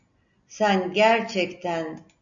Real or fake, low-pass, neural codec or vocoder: real; 7.2 kHz; none